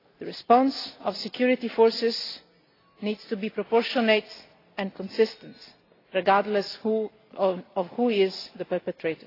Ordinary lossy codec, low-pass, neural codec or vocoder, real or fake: AAC, 24 kbps; 5.4 kHz; none; real